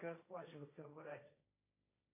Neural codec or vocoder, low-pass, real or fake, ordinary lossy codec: codec, 16 kHz, 1.1 kbps, Voila-Tokenizer; 3.6 kHz; fake; MP3, 24 kbps